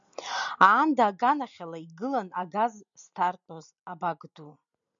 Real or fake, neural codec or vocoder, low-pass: real; none; 7.2 kHz